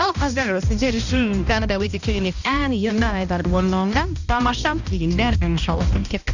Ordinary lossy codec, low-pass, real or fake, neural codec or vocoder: none; 7.2 kHz; fake; codec, 16 kHz, 1 kbps, X-Codec, HuBERT features, trained on balanced general audio